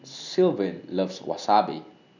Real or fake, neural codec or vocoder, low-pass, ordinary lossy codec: real; none; 7.2 kHz; none